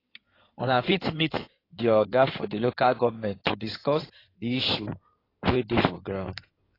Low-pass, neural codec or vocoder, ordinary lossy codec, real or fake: 5.4 kHz; codec, 16 kHz in and 24 kHz out, 2.2 kbps, FireRedTTS-2 codec; AAC, 24 kbps; fake